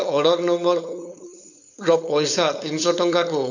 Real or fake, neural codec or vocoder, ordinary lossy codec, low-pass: fake; codec, 16 kHz, 4.8 kbps, FACodec; none; 7.2 kHz